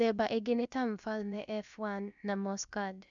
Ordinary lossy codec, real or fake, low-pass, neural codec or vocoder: none; fake; 7.2 kHz; codec, 16 kHz, about 1 kbps, DyCAST, with the encoder's durations